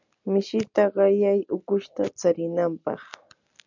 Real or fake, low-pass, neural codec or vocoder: real; 7.2 kHz; none